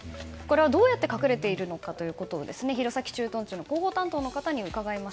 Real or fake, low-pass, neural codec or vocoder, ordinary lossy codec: real; none; none; none